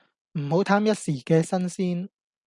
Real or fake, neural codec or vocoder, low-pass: real; none; 10.8 kHz